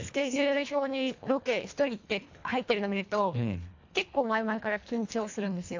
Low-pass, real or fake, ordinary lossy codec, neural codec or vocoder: 7.2 kHz; fake; AAC, 48 kbps; codec, 24 kHz, 1.5 kbps, HILCodec